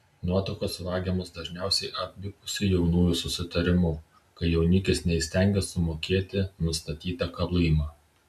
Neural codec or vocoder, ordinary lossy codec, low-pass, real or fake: none; MP3, 96 kbps; 14.4 kHz; real